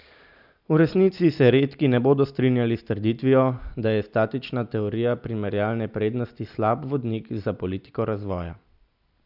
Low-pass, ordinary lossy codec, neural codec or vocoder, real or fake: 5.4 kHz; none; none; real